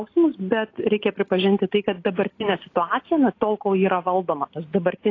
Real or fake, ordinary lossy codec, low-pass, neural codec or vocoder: real; AAC, 32 kbps; 7.2 kHz; none